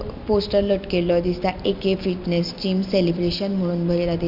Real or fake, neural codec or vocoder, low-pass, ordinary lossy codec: real; none; 5.4 kHz; none